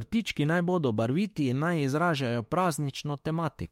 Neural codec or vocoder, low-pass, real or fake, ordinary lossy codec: autoencoder, 48 kHz, 32 numbers a frame, DAC-VAE, trained on Japanese speech; 19.8 kHz; fake; MP3, 64 kbps